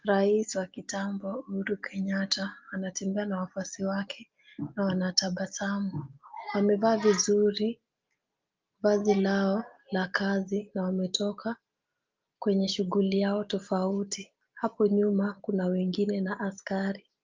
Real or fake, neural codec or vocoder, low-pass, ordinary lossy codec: real; none; 7.2 kHz; Opus, 32 kbps